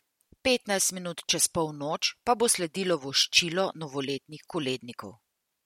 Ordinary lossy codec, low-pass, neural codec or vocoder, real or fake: MP3, 64 kbps; 19.8 kHz; none; real